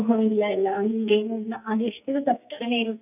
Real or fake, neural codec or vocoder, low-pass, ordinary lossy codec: fake; codec, 44.1 kHz, 2.6 kbps, SNAC; 3.6 kHz; none